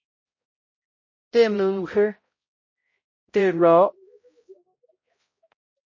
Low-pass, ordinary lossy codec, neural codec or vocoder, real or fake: 7.2 kHz; MP3, 32 kbps; codec, 16 kHz, 0.5 kbps, X-Codec, HuBERT features, trained on balanced general audio; fake